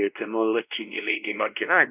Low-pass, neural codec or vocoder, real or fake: 3.6 kHz; codec, 16 kHz, 1 kbps, X-Codec, WavLM features, trained on Multilingual LibriSpeech; fake